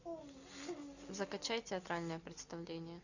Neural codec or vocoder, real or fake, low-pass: none; real; 7.2 kHz